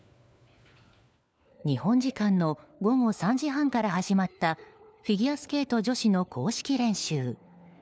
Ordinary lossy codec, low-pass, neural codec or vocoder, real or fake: none; none; codec, 16 kHz, 4 kbps, FunCodec, trained on LibriTTS, 50 frames a second; fake